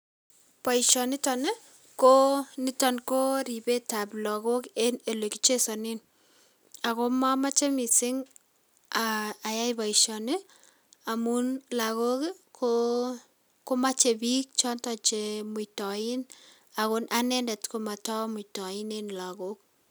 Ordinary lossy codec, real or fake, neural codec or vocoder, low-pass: none; real; none; none